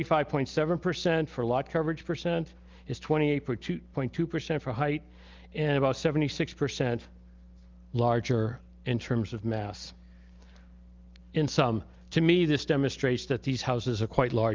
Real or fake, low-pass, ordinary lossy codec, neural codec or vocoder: real; 7.2 kHz; Opus, 24 kbps; none